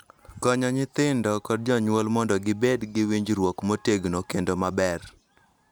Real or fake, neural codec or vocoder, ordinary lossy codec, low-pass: real; none; none; none